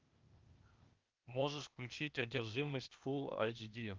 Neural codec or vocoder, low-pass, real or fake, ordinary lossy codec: codec, 16 kHz, 0.8 kbps, ZipCodec; 7.2 kHz; fake; Opus, 24 kbps